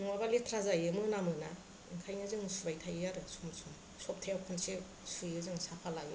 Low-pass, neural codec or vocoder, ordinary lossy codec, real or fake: none; none; none; real